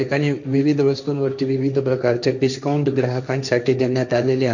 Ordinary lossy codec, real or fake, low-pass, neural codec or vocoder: none; fake; 7.2 kHz; codec, 16 kHz, 1.1 kbps, Voila-Tokenizer